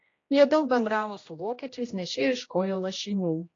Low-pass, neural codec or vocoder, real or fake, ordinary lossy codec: 7.2 kHz; codec, 16 kHz, 1 kbps, X-Codec, HuBERT features, trained on general audio; fake; AAC, 32 kbps